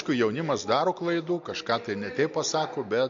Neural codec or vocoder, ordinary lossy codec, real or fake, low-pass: none; MP3, 48 kbps; real; 7.2 kHz